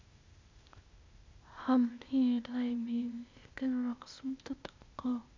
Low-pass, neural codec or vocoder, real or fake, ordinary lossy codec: 7.2 kHz; codec, 16 kHz, 0.8 kbps, ZipCodec; fake; MP3, 64 kbps